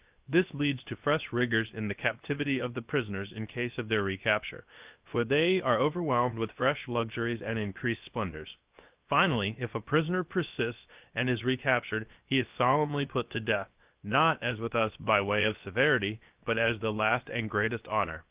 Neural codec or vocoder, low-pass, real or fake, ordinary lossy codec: codec, 16 kHz, 0.7 kbps, FocalCodec; 3.6 kHz; fake; Opus, 24 kbps